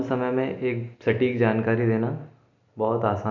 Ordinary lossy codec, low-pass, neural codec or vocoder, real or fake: none; 7.2 kHz; none; real